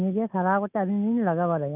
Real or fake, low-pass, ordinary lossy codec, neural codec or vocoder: real; 3.6 kHz; none; none